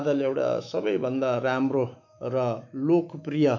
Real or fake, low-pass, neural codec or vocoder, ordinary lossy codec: fake; 7.2 kHz; autoencoder, 48 kHz, 128 numbers a frame, DAC-VAE, trained on Japanese speech; none